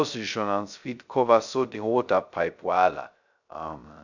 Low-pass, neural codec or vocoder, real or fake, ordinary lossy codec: 7.2 kHz; codec, 16 kHz, 0.2 kbps, FocalCodec; fake; none